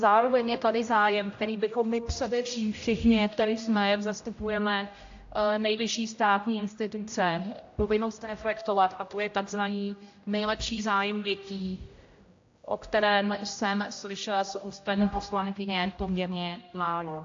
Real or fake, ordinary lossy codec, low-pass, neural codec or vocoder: fake; AAC, 48 kbps; 7.2 kHz; codec, 16 kHz, 0.5 kbps, X-Codec, HuBERT features, trained on general audio